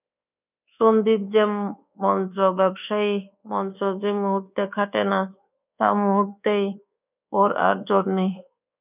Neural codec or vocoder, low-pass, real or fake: codec, 24 kHz, 1.2 kbps, DualCodec; 3.6 kHz; fake